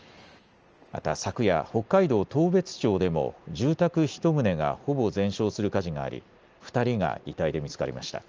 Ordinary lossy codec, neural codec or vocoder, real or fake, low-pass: Opus, 24 kbps; none; real; 7.2 kHz